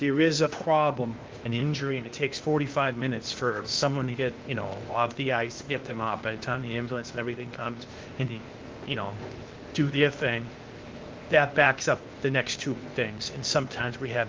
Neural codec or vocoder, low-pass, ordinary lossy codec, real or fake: codec, 16 kHz, 0.8 kbps, ZipCodec; 7.2 kHz; Opus, 32 kbps; fake